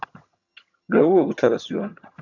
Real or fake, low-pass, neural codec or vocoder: fake; 7.2 kHz; vocoder, 22.05 kHz, 80 mel bands, HiFi-GAN